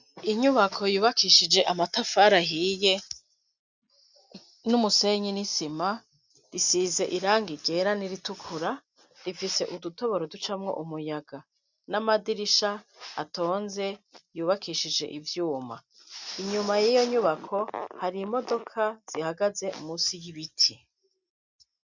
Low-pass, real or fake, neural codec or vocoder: 7.2 kHz; real; none